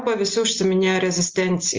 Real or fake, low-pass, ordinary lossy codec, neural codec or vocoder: real; 7.2 kHz; Opus, 32 kbps; none